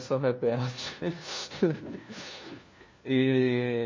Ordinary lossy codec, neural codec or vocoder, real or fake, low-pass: MP3, 32 kbps; codec, 16 kHz, 1 kbps, FunCodec, trained on LibriTTS, 50 frames a second; fake; 7.2 kHz